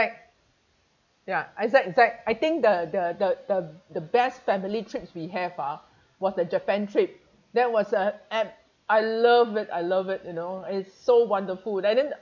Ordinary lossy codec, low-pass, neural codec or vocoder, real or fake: none; 7.2 kHz; none; real